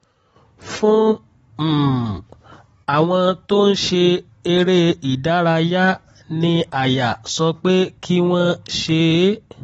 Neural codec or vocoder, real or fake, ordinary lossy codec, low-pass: none; real; AAC, 24 kbps; 19.8 kHz